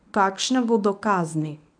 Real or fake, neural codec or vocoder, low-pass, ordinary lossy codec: fake; codec, 24 kHz, 0.9 kbps, WavTokenizer, medium speech release version 1; 9.9 kHz; none